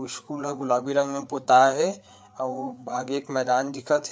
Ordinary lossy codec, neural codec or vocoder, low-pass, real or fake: none; codec, 16 kHz, 4 kbps, FreqCodec, larger model; none; fake